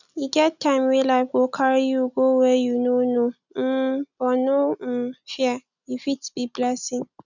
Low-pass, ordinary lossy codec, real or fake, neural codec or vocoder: 7.2 kHz; none; real; none